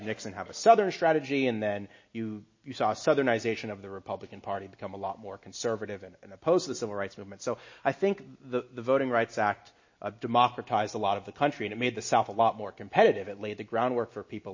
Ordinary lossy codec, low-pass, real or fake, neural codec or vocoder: MP3, 32 kbps; 7.2 kHz; real; none